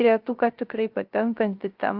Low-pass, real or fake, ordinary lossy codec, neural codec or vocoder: 5.4 kHz; fake; Opus, 32 kbps; codec, 16 kHz, 0.3 kbps, FocalCodec